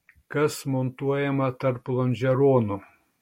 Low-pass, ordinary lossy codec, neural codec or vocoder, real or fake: 19.8 kHz; MP3, 64 kbps; none; real